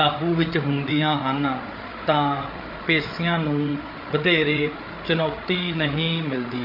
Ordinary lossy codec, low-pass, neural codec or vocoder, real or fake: MP3, 32 kbps; 5.4 kHz; codec, 16 kHz, 8 kbps, FreqCodec, larger model; fake